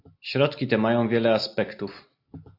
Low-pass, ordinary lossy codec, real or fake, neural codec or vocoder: 5.4 kHz; AAC, 32 kbps; real; none